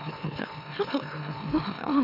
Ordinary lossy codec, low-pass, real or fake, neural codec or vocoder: none; 5.4 kHz; fake; autoencoder, 44.1 kHz, a latent of 192 numbers a frame, MeloTTS